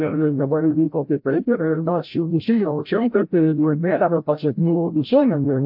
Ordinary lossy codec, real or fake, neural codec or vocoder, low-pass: AAC, 48 kbps; fake; codec, 16 kHz, 0.5 kbps, FreqCodec, larger model; 5.4 kHz